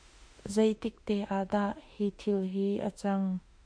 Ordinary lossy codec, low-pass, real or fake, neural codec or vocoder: MP3, 48 kbps; 9.9 kHz; fake; autoencoder, 48 kHz, 32 numbers a frame, DAC-VAE, trained on Japanese speech